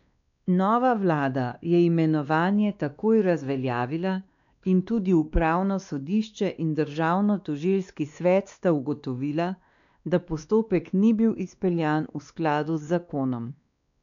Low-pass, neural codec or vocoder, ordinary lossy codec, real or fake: 7.2 kHz; codec, 16 kHz, 2 kbps, X-Codec, WavLM features, trained on Multilingual LibriSpeech; none; fake